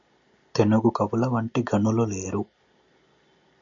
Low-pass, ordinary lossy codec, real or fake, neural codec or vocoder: 7.2 kHz; AAC, 64 kbps; real; none